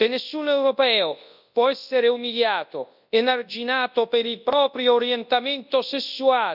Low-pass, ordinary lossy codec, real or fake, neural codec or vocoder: 5.4 kHz; none; fake; codec, 24 kHz, 0.9 kbps, WavTokenizer, large speech release